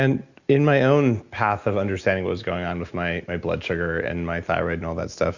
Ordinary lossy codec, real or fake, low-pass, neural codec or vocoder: Opus, 64 kbps; real; 7.2 kHz; none